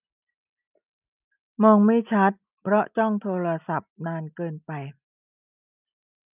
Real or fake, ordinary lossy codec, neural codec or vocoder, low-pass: real; none; none; 3.6 kHz